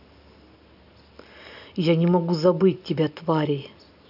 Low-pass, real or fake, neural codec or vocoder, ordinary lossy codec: 5.4 kHz; real; none; none